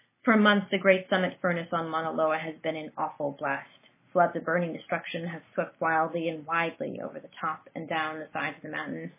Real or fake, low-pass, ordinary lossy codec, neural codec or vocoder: real; 3.6 kHz; MP3, 16 kbps; none